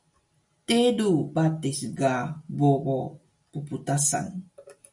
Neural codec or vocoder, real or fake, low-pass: none; real; 10.8 kHz